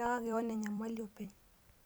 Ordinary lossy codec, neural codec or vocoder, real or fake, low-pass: none; vocoder, 44.1 kHz, 128 mel bands every 256 samples, BigVGAN v2; fake; none